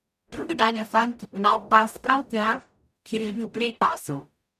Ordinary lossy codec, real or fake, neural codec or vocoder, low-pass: none; fake; codec, 44.1 kHz, 0.9 kbps, DAC; 14.4 kHz